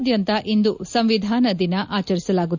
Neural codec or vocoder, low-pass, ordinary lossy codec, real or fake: none; 7.2 kHz; none; real